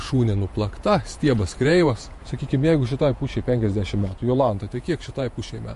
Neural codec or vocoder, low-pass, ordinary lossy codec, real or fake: vocoder, 48 kHz, 128 mel bands, Vocos; 14.4 kHz; MP3, 48 kbps; fake